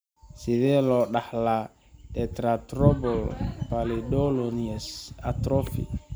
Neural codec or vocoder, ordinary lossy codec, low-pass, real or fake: none; none; none; real